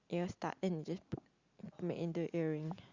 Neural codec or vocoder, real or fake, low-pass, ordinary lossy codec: none; real; 7.2 kHz; Opus, 64 kbps